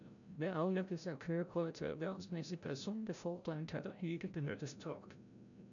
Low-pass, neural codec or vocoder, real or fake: 7.2 kHz; codec, 16 kHz, 0.5 kbps, FreqCodec, larger model; fake